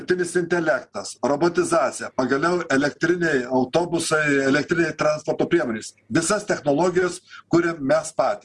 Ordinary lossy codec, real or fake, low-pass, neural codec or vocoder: Opus, 24 kbps; real; 10.8 kHz; none